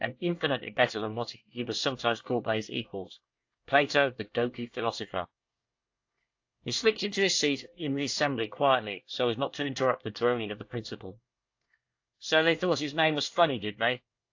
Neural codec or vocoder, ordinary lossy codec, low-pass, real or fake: codec, 24 kHz, 1 kbps, SNAC; AAC, 48 kbps; 7.2 kHz; fake